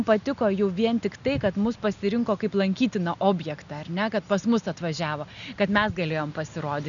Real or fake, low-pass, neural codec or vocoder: real; 7.2 kHz; none